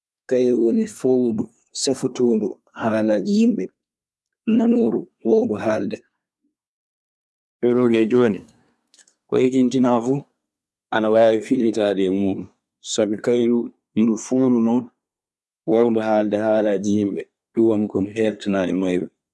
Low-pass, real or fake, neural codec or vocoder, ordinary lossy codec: none; fake; codec, 24 kHz, 1 kbps, SNAC; none